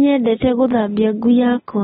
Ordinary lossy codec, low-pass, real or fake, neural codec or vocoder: AAC, 16 kbps; 19.8 kHz; real; none